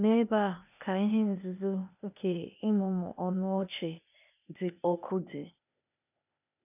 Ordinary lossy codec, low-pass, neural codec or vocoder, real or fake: none; 3.6 kHz; codec, 16 kHz, 0.8 kbps, ZipCodec; fake